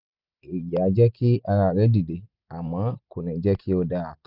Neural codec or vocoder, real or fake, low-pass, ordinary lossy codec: none; real; 5.4 kHz; none